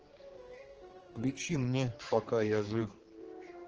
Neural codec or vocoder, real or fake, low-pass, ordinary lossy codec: codec, 16 kHz, 4 kbps, X-Codec, HuBERT features, trained on general audio; fake; 7.2 kHz; Opus, 16 kbps